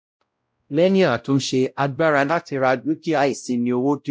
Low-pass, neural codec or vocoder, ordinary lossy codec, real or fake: none; codec, 16 kHz, 0.5 kbps, X-Codec, WavLM features, trained on Multilingual LibriSpeech; none; fake